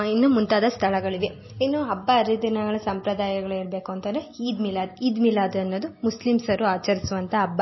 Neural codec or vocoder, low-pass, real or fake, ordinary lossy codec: none; 7.2 kHz; real; MP3, 24 kbps